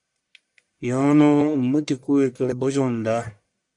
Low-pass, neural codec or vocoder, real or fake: 10.8 kHz; codec, 44.1 kHz, 1.7 kbps, Pupu-Codec; fake